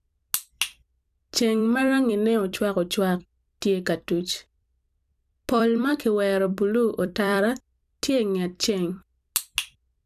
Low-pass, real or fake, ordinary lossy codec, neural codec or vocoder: 14.4 kHz; fake; none; vocoder, 44.1 kHz, 128 mel bands every 256 samples, BigVGAN v2